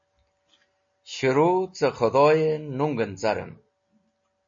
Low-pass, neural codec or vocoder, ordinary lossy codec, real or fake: 7.2 kHz; none; MP3, 32 kbps; real